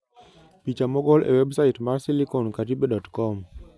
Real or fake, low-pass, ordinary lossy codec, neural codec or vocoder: real; none; none; none